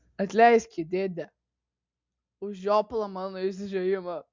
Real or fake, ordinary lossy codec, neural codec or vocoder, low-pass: real; MP3, 64 kbps; none; 7.2 kHz